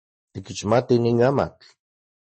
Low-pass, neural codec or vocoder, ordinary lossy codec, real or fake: 10.8 kHz; none; MP3, 32 kbps; real